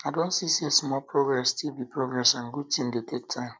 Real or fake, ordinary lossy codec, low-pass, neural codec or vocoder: real; none; none; none